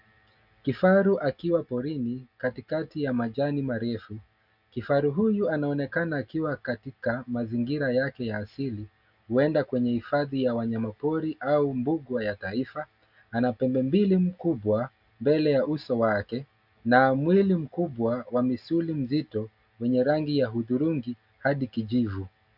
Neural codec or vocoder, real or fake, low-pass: none; real; 5.4 kHz